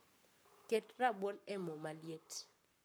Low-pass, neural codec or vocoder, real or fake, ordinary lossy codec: none; codec, 44.1 kHz, 7.8 kbps, Pupu-Codec; fake; none